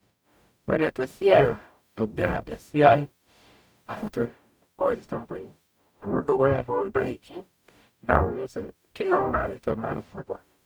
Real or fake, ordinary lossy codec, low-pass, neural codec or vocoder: fake; none; none; codec, 44.1 kHz, 0.9 kbps, DAC